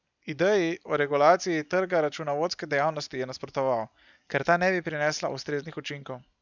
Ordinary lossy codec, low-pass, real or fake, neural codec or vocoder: none; 7.2 kHz; real; none